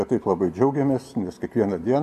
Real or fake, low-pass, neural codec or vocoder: real; 14.4 kHz; none